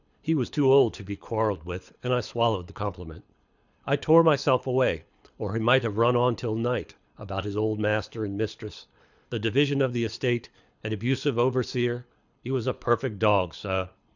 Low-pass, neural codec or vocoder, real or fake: 7.2 kHz; codec, 24 kHz, 6 kbps, HILCodec; fake